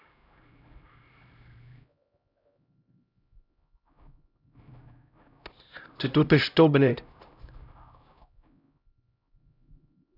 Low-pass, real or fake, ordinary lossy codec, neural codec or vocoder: 5.4 kHz; fake; none; codec, 16 kHz, 0.5 kbps, X-Codec, HuBERT features, trained on LibriSpeech